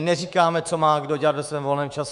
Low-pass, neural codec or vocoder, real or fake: 10.8 kHz; codec, 24 kHz, 3.1 kbps, DualCodec; fake